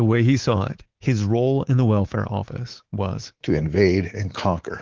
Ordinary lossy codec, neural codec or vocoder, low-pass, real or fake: Opus, 16 kbps; codec, 24 kHz, 3.1 kbps, DualCodec; 7.2 kHz; fake